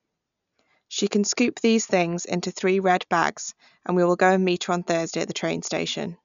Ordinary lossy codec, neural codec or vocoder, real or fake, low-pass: none; none; real; 7.2 kHz